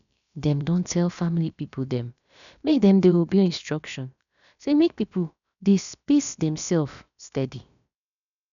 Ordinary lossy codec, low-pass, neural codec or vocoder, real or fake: none; 7.2 kHz; codec, 16 kHz, about 1 kbps, DyCAST, with the encoder's durations; fake